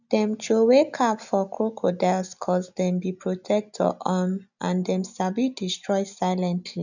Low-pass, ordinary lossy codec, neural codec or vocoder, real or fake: 7.2 kHz; none; none; real